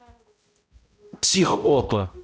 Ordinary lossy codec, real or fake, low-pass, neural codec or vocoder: none; fake; none; codec, 16 kHz, 0.5 kbps, X-Codec, HuBERT features, trained on balanced general audio